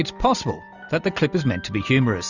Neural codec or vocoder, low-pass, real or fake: none; 7.2 kHz; real